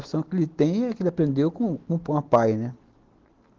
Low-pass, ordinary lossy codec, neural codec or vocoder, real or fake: 7.2 kHz; Opus, 16 kbps; none; real